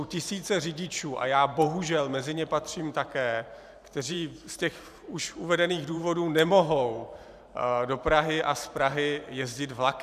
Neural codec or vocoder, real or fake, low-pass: vocoder, 44.1 kHz, 128 mel bands every 512 samples, BigVGAN v2; fake; 14.4 kHz